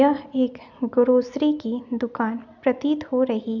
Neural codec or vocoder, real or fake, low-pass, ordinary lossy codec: none; real; 7.2 kHz; MP3, 64 kbps